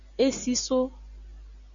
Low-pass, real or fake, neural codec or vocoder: 7.2 kHz; real; none